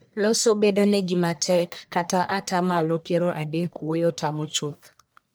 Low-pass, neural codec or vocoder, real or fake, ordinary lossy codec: none; codec, 44.1 kHz, 1.7 kbps, Pupu-Codec; fake; none